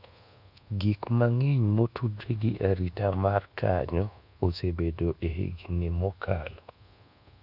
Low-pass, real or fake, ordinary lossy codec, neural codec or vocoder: 5.4 kHz; fake; none; codec, 24 kHz, 1.2 kbps, DualCodec